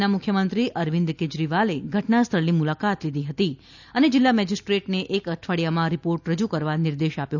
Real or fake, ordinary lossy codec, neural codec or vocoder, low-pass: real; none; none; 7.2 kHz